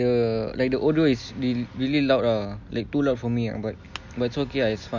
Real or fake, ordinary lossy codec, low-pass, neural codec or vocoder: real; none; 7.2 kHz; none